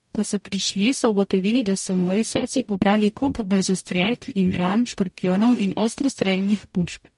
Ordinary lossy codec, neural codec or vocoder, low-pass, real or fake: MP3, 48 kbps; codec, 44.1 kHz, 0.9 kbps, DAC; 14.4 kHz; fake